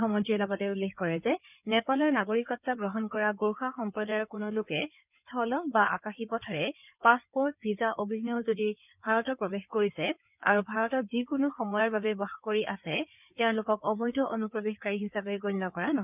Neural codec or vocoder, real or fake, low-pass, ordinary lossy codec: codec, 16 kHz in and 24 kHz out, 2.2 kbps, FireRedTTS-2 codec; fake; 3.6 kHz; none